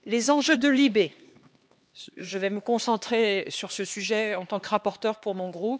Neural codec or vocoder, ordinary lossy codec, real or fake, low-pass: codec, 16 kHz, 2 kbps, X-Codec, HuBERT features, trained on LibriSpeech; none; fake; none